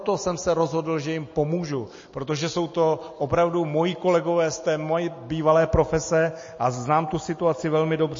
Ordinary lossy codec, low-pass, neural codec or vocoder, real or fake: MP3, 32 kbps; 7.2 kHz; none; real